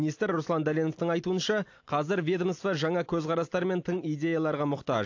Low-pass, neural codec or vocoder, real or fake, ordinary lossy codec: 7.2 kHz; none; real; AAC, 48 kbps